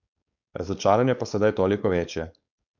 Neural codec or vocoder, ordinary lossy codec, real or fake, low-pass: codec, 16 kHz, 4.8 kbps, FACodec; none; fake; 7.2 kHz